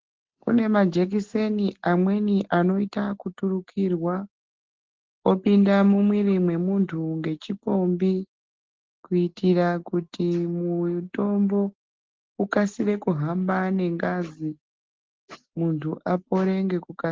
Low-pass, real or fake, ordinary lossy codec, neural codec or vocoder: 7.2 kHz; real; Opus, 16 kbps; none